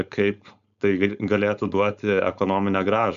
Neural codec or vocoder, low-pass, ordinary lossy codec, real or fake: codec, 16 kHz, 4.8 kbps, FACodec; 7.2 kHz; AAC, 96 kbps; fake